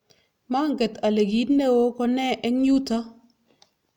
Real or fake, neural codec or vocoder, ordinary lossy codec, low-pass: real; none; none; 19.8 kHz